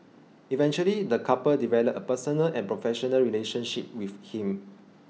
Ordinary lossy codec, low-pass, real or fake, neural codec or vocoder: none; none; real; none